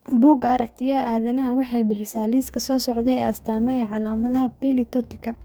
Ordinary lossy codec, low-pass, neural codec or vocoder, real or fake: none; none; codec, 44.1 kHz, 2.6 kbps, DAC; fake